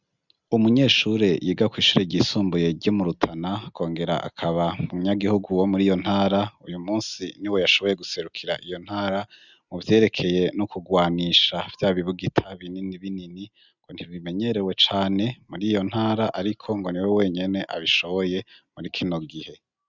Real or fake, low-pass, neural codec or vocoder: real; 7.2 kHz; none